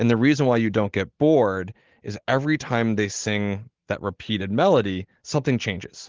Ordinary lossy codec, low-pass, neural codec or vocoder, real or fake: Opus, 16 kbps; 7.2 kHz; none; real